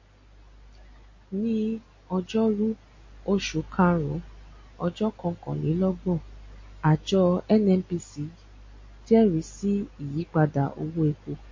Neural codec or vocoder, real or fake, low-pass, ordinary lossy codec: none; real; 7.2 kHz; MP3, 32 kbps